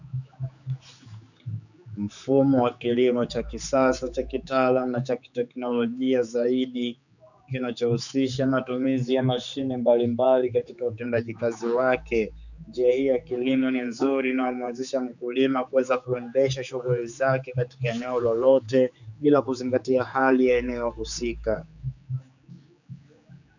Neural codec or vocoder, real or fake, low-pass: codec, 16 kHz, 4 kbps, X-Codec, HuBERT features, trained on general audio; fake; 7.2 kHz